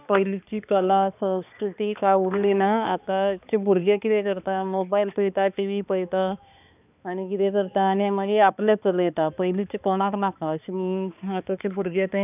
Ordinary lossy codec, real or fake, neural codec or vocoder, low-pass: none; fake; codec, 16 kHz, 2 kbps, X-Codec, HuBERT features, trained on balanced general audio; 3.6 kHz